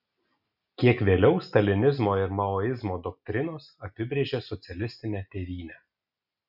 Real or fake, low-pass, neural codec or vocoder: real; 5.4 kHz; none